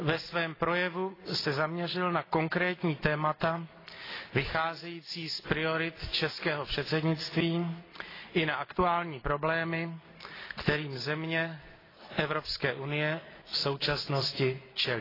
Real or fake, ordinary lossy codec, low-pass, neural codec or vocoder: real; AAC, 24 kbps; 5.4 kHz; none